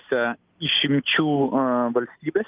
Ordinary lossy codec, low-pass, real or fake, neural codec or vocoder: Opus, 32 kbps; 3.6 kHz; real; none